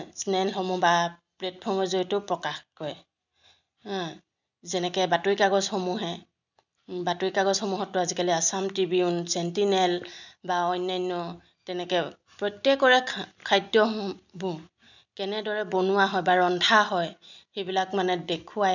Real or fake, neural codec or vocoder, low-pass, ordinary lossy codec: real; none; 7.2 kHz; none